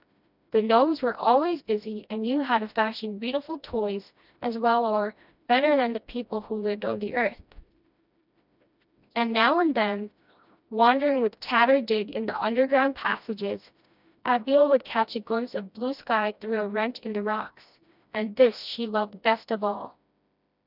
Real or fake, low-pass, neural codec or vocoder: fake; 5.4 kHz; codec, 16 kHz, 1 kbps, FreqCodec, smaller model